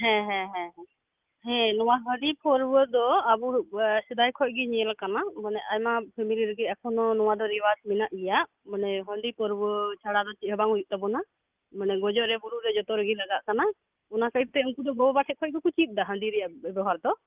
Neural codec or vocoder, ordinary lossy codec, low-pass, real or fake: none; Opus, 32 kbps; 3.6 kHz; real